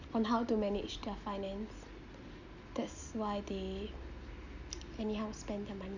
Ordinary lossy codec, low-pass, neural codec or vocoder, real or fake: none; 7.2 kHz; none; real